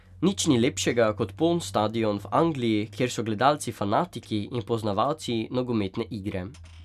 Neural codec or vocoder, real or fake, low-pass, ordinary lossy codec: none; real; 14.4 kHz; none